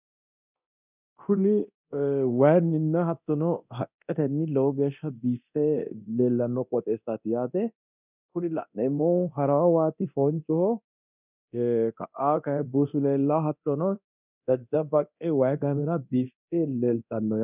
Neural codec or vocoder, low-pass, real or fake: codec, 24 kHz, 0.9 kbps, DualCodec; 3.6 kHz; fake